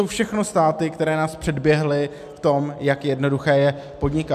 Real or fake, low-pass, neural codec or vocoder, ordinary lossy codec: real; 14.4 kHz; none; MP3, 96 kbps